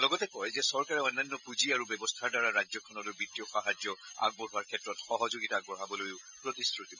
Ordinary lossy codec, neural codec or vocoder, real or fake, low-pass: none; none; real; 7.2 kHz